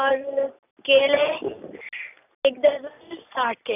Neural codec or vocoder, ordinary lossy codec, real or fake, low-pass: none; none; real; 3.6 kHz